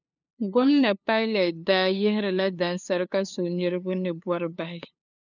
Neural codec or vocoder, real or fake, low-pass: codec, 16 kHz, 2 kbps, FunCodec, trained on LibriTTS, 25 frames a second; fake; 7.2 kHz